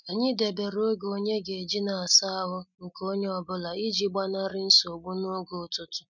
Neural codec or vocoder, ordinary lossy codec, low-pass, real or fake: none; none; 7.2 kHz; real